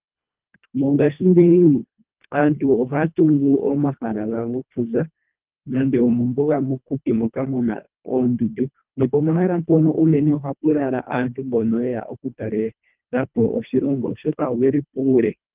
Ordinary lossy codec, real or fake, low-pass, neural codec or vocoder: Opus, 32 kbps; fake; 3.6 kHz; codec, 24 kHz, 1.5 kbps, HILCodec